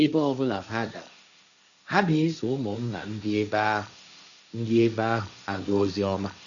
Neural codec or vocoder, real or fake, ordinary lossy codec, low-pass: codec, 16 kHz, 1.1 kbps, Voila-Tokenizer; fake; none; 7.2 kHz